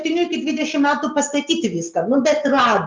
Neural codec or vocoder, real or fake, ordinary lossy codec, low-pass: none; real; Opus, 24 kbps; 7.2 kHz